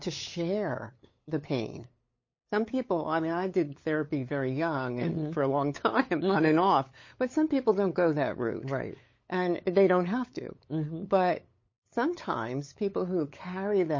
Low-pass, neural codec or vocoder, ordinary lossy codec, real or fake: 7.2 kHz; codec, 16 kHz, 8 kbps, FunCodec, trained on LibriTTS, 25 frames a second; MP3, 32 kbps; fake